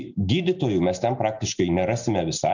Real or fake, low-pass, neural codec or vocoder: real; 7.2 kHz; none